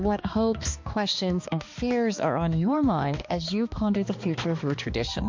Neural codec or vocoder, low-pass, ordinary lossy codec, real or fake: codec, 16 kHz, 2 kbps, X-Codec, HuBERT features, trained on balanced general audio; 7.2 kHz; MP3, 48 kbps; fake